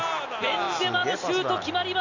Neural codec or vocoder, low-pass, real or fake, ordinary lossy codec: none; 7.2 kHz; real; none